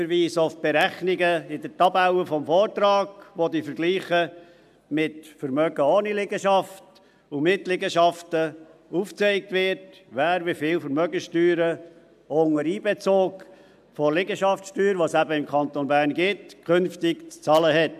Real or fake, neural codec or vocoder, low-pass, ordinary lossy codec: real; none; 14.4 kHz; AAC, 96 kbps